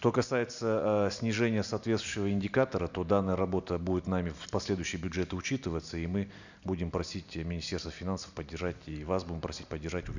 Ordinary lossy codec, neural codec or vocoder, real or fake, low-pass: none; none; real; 7.2 kHz